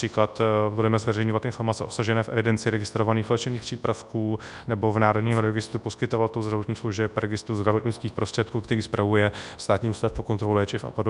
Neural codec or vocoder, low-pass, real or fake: codec, 24 kHz, 0.9 kbps, WavTokenizer, large speech release; 10.8 kHz; fake